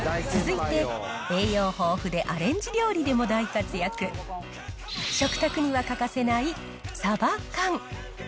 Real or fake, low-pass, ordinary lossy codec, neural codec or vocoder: real; none; none; none